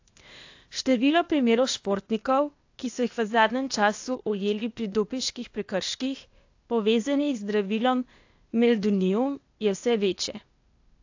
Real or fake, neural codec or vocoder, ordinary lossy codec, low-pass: fake; codec, 16 kHz, 0.8 kbps, ZipCodec; AAC, 48 kbps; 7.2 kHz